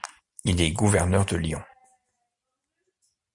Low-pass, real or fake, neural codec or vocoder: 10.8 kHz; real; none